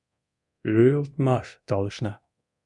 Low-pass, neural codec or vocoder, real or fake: 10.8 kHz; codec, 24 kHz, 0.9 kbps, DualCodec; fake